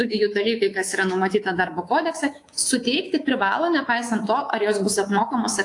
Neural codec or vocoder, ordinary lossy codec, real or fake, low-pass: codec, 24 kHz, 3.1 kbps, DualCodec; AAC, 48 kbps; fake; 10.8 kHz